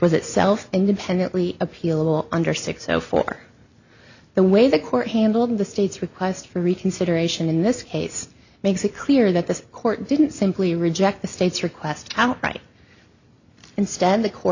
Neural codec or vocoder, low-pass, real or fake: none; 7.2 kHz; real